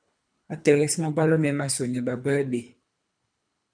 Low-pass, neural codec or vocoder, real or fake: 9.9 kHz; codec, 24 kHz, 3 kbps, HILCodec; fake